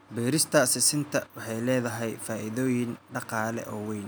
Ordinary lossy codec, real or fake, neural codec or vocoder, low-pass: none; real; none; none